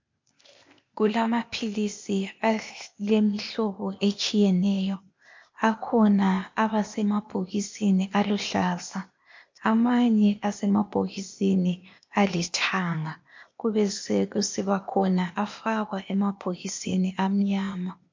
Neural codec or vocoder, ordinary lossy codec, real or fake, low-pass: codec, 16 kHz, 0.8 kbps, ZipCodec; MP3, 48 kbps; fake; 7.2 kHz